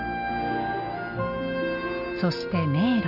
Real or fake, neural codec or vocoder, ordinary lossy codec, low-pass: real; none; none; 5.4 kHz